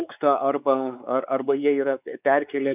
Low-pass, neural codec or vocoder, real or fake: 3.6 kHz; codec, 16 kHz, 4 kbps, X-Codec, WavLM features, trained on Multilingual LibriSpeech; fake